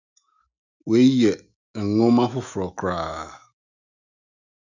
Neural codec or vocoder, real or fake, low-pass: autoencoder, 48 kHz, 128 numbers a frame, DAC-VAE, trained on Japanese speech; fake; 7.2 kHz